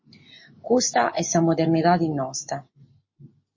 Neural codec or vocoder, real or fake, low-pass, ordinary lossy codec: none; real; 7.2 kHz; MP3, 32 kbps